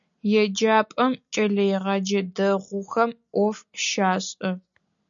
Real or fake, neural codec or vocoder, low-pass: real; none; 7.2 kHz